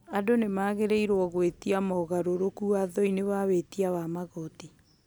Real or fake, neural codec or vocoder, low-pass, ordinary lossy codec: real; none; none; none